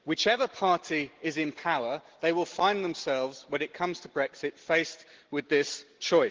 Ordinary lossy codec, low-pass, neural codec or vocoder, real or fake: Opus, 16 kbps; 7.2 kHz; none; real